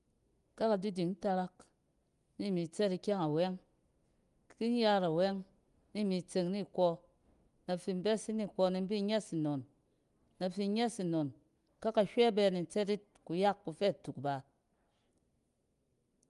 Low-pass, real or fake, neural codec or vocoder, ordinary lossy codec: 10.8 kHz; real; none; Opus, 32 kbps